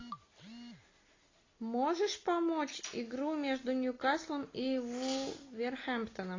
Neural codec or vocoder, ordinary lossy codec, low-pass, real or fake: none; AAC, 32 kbps; 7.2 kHz; real